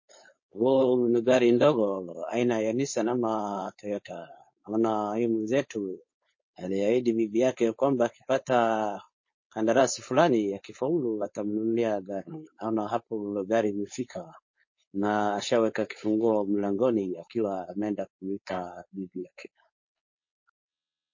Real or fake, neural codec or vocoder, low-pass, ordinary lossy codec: fake; codec, 16 kHz, 4.8 kbps, FACodec; 7.2 kHz; MP3, 32 kbps